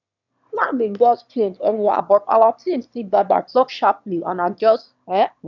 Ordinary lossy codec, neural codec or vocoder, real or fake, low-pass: none; autoencoder, 22.05 kHz, a latent of 192 numbers a frame, VITS, trained on one speaker; fake; 7.2 kHz